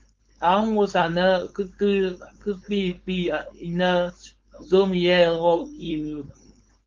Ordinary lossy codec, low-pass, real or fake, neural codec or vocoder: Opus, 32 kbps; 7.2 kHz; fake; codec, 16 kHz, 4.8 kbps, FACodec